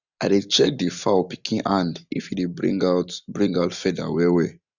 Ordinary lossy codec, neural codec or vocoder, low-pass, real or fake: none; none; 7.2 kHz; real